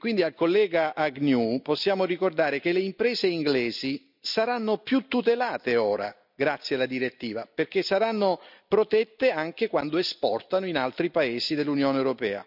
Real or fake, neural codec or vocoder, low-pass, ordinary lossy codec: real; none; 5.4 kHz; none